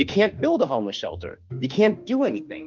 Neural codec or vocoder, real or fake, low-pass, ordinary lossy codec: autoencoder, 48 kHz, 32 numbers a frame, DAC-VAE, trained on Japanese speech; fake; 7.2 kHz; Opus, 24 kbps